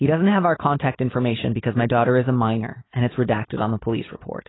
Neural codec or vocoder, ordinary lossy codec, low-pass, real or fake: none; AAC, 16 kbps; 7.2 kHz; real